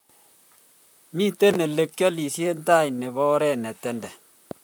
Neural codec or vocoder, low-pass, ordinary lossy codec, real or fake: vocoder, 44.1 kHz, 128 mel bands, Pupu-Vocoder; none; none; fake